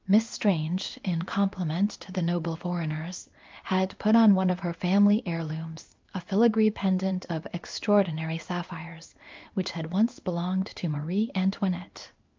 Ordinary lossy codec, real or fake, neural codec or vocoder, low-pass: Opus, 24 kbps; fake; codec, 16 kHz in and 24 kHz out, 1 kbps, XY-Tokenizer; 7.2 kHz